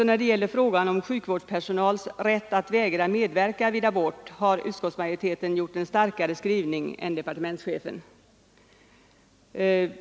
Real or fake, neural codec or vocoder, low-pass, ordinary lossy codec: real; none; none; none